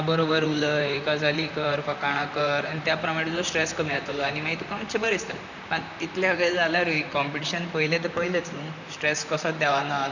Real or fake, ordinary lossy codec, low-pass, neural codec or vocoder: fake; none; 7.2 kHz; vocoder, 44.1 kHz, 128 mel bands, Pupu-Vocoder